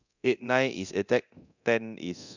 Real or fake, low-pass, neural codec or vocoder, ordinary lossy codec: fake; 7.2 kHz; codec, 24 kHz, 0.9 kbps, DualCodec; none